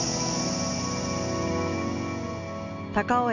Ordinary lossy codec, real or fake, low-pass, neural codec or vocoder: none; real; 7.2 kHz; none